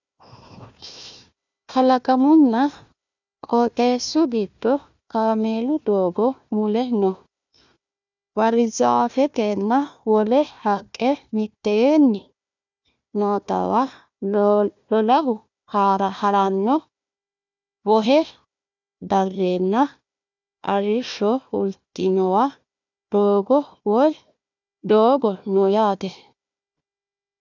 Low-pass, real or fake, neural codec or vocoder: 7.2 kHz; fake; codec, 16 kHz, 1 kbps, FunCodec, trained on Chinese and English, 50 frames a second